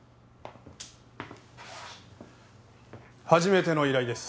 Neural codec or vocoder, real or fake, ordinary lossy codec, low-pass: none; real; none; none